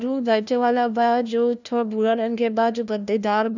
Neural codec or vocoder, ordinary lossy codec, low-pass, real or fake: codec, 16 kHz, 1 kbps, FunCodec, trained on LibriTTS, 50 frames a second; none; 7.2 kHz; fake